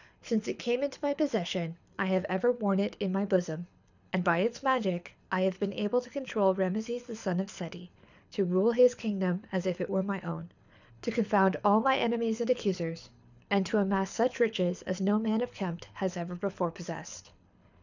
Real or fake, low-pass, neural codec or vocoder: fake; 7.2 kHz; codec, 24 kHz, 6 kbps, HILCodec